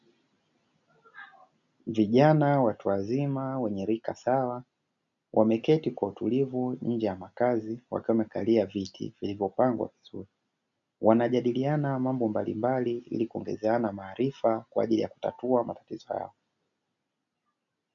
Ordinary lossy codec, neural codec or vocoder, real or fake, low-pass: MP3, 96 kbps; none; real; 7.2 kHz